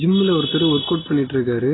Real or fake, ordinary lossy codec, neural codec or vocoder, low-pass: real; AAC, 16 kbps; none; 7.2 kHz